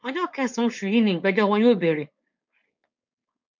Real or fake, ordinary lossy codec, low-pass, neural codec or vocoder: fake; MP3, 48 kbps; 7.2 kHz; codec, 16 kHz, 4.8 kbps, FACodec